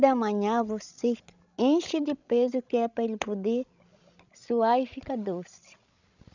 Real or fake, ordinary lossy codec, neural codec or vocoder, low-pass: fake; none; codec, 16 kHz, 16 kbps, FreqCodec, larger model; 7.2 kHz